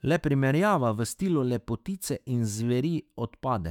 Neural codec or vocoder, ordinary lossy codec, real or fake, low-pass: autoencoder, 48 kHz, 128 numbers a frame, DAC-VAE, trained on Japanese speech; none; fake; 19.8 kHz